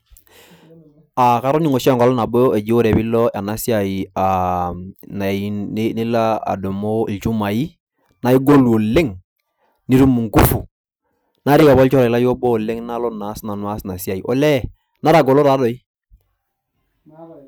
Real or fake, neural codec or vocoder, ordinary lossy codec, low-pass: real; none; none; none